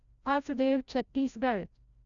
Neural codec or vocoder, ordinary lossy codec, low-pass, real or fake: codec, 16 kHz, 0.5 kbps, FreqCodec, larger model; none; 7.2 kHz; fake